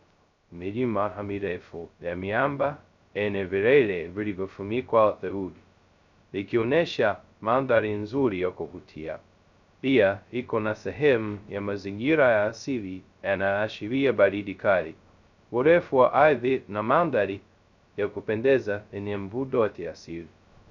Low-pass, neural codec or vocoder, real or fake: 7.2 kHz; codec, 16 kHz, 0.2 kbps, FocalCodec; fake